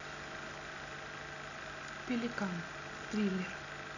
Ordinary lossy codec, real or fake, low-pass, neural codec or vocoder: none; real; 7.2 kHz; none